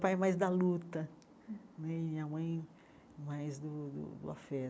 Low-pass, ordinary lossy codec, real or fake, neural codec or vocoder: none; none; real; none